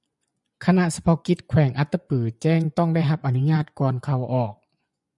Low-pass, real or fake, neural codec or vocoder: 10.8 kHz; fake; vocoder, 24 kHz, 100 mel bands, Vocos